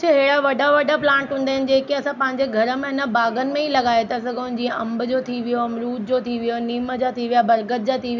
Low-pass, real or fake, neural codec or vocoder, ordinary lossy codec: 7.2 kHz; real; none; none